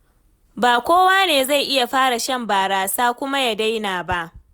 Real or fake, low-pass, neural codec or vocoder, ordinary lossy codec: real; none; none; none